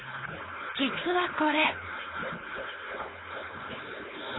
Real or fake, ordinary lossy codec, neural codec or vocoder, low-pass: fake; AAC, 16 kbps; codec, 16 kHz, 4.8 kbps, FACodec; 7.2 kHz